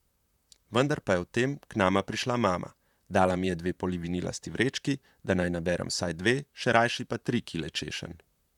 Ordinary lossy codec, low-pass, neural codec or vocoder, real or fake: none; 19.8 kHz; vocoder, 44.1 kHz, 128 mel bands, Pupu-Vocoder; fake